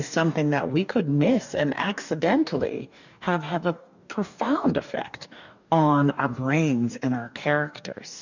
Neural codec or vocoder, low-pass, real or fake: codec, 44.1 kHz, 2.6 kbps, DAC; 7.2 kHz; fake